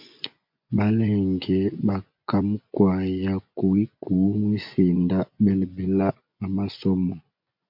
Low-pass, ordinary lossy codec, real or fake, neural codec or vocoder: 5.4 kHz; MP3, 48 kbps; fake; vocoder, 24 kHz, 100 mel bands, Vocos